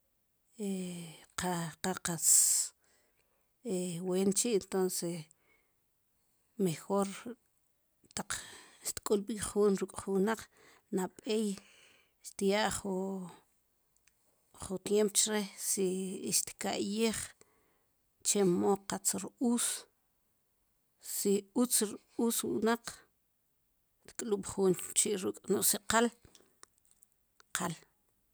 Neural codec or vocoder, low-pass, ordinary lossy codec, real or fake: none; none; none; real